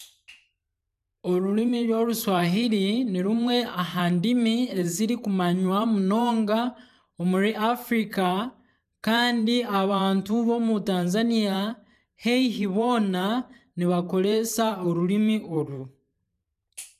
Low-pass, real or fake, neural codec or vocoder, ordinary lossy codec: 14.4 kHz; fake; vocoder, 44.1 kHz, 128 mel bands every 512 samples, BigVGAN v2; none